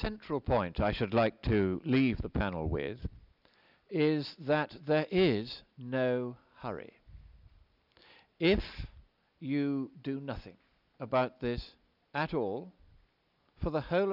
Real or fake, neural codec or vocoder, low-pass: real; none; 5.4 kHz